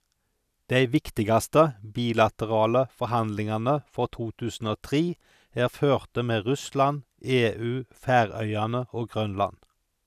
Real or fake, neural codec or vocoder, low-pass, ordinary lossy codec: real; none; 14.4 kHz; none